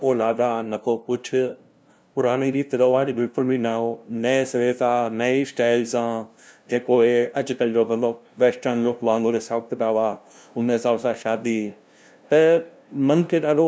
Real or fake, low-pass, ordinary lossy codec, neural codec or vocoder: fake; none; none; codec, 16 kHz, 0.5 kbps, FunCodec, trained on LibriTTS, 25 frames a second